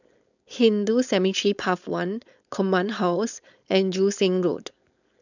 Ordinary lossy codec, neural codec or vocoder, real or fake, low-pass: none; codec, 16 kHz, 4.8 kbps, FACodec; fake; 7.2 kHz